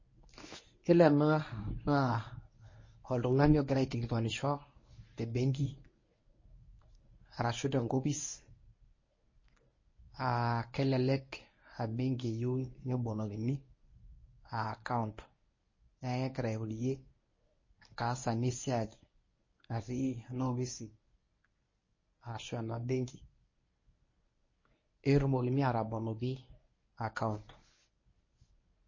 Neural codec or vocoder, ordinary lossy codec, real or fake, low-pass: codec, 24 kHz, 0.9 kbps, WavTokenizer, medium speech release version 1; MP3, 32 kbps; fake; 7.2 kHz